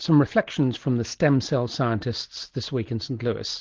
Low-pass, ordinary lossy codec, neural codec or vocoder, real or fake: 7.2 kHz; Opus, 16 kbps; none; real